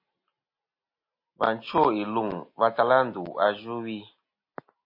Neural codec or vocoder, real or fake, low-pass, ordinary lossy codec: none; real; 5.4 kHz; MP3, 24 kbps